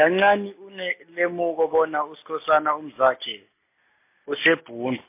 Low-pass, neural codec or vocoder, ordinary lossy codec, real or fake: 3.6 kHz; none; MP3, 24 kbps; real